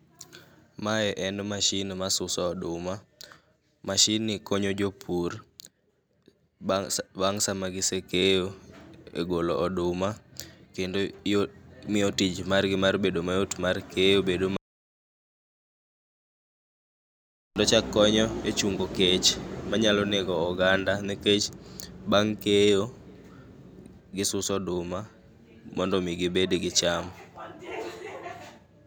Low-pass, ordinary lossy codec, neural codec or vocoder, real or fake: none; none; none; real